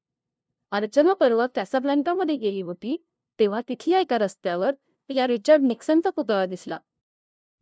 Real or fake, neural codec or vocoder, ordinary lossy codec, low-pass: fake; codec, 16 kHz, 0.5 kbps, FunCodec, trained on LibriTTS, 25 frames a second; none; none